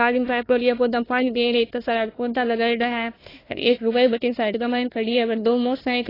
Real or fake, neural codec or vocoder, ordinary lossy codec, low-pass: fake; codec, 16 kHz, 1 kbps, FunCodec, trained on Chinese and English, 50 frames a second; AAC, 24 kbps; 5.4 kHz